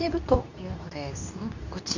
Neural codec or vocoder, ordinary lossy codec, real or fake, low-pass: codec, 24 kHz, 0.9 kbps, WavTokenizer, medium speech release version 2; none; fake; 7.2 kHz